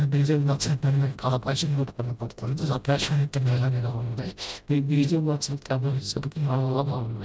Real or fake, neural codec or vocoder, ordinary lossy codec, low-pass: fake; codec, 16 kHz, 0.5 kbps, FreqCodec, smaller model; none; none